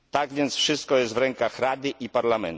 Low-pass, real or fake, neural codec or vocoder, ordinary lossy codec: none; real; none; none